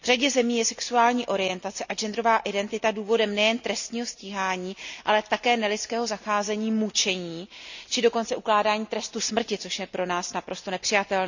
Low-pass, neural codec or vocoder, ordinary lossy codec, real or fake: 7.2 kHz; none; none; real